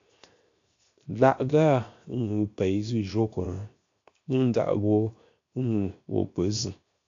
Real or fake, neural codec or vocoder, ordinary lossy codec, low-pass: fake; codec, 16 kHz, 0.7 kbps, FocalCodec; AAC, 48 kbps; 7.2 kHz